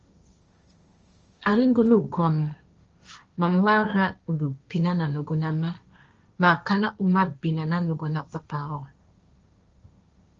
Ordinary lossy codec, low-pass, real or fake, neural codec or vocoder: Opus, 24 kbps; 7.2 kHz; fake; codec, 16 kHz, 1.1 kbps, Voila-Tokenizer